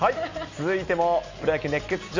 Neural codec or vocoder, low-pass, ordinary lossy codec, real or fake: none; 7.2 kHz; MP3, 48 kbps; real